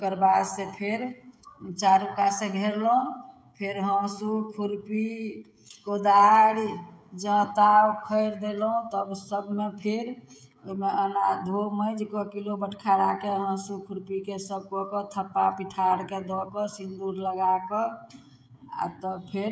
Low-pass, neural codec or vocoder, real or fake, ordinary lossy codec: none; codec, 16 kHz, 16 kbps, FreqCodec, smaller model; fake; none